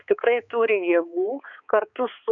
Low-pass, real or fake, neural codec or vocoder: 7.2 kHz; fake; codec, 16 kHz, 4 kbps, X-Codec, HuBERT features, trained on balanced general audio